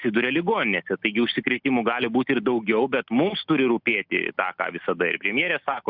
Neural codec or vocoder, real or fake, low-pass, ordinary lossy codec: none; real; 5.4 kHz; Opus, 64 kbps